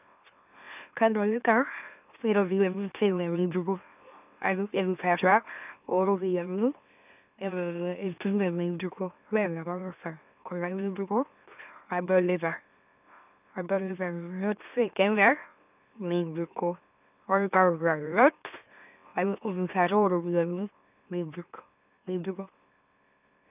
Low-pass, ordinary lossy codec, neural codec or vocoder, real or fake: 3.6 kHz; none; autoencoder, 44.1 kHz, a latent of 192 numbers a frame, MeloTTS; fake